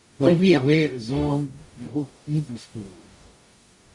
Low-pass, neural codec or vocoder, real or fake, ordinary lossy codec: 10.8 kHz; codec, 44.1 kHz, 0.9 kbps, DAC; fake; MP3, 64 kbps